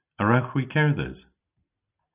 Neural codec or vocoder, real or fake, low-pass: none; real; 3.6 kHz